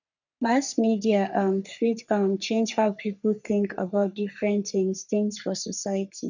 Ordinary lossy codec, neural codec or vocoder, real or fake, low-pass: none; codec, 44.1 kHz, 3.4 kbps, Pupu-Codec; fake; 7.2 kHz